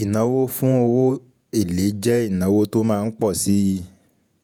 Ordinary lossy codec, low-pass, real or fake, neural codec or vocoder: none; none; fake; vocoder, 48 kHz, 128 mel bands, Vocos